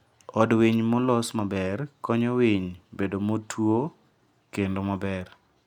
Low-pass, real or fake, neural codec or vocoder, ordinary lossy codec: 19.8 kHz; real; none; none